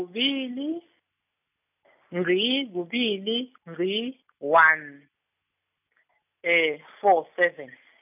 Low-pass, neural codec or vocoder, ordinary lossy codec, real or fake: 3.6 kHz; none; none; real